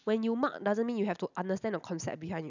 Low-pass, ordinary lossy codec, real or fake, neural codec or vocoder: 7.2 kHz; none; real; none